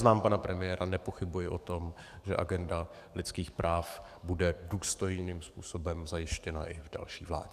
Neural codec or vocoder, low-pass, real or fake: codec, 44.1 kHz, 7.8 kbps, DAC; 14.4 kHz; fake